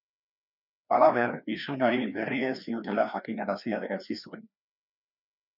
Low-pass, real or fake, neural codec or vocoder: 5.4 kHz; fake; codec, 16 kHz, 2 kbps, FreqCodec, larger model